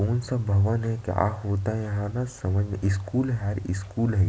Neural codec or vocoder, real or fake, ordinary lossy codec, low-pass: none; real; none; none